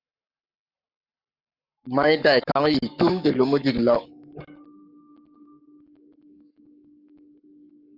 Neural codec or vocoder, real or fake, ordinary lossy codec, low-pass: codec, 44.1 kHz, 7.8 kbps, Pupu-Codec; fake; Opus, 64 kbps; 5.4 kHz